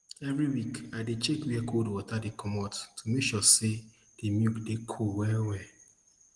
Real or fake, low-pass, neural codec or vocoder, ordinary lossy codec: real; 10.8 kHz; none; Opus, 24 kbps